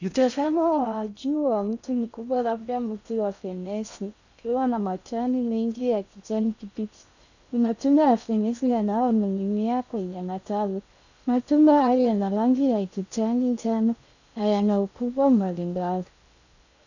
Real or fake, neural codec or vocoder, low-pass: fake; codec, 16 kHz in and 24 kHz out, 0.6 kbps, FocalCodec, streaming, 4096 codes; 7.2 kHz